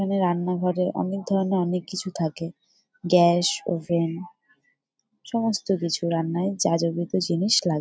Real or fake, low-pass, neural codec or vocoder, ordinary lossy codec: real; none; none; none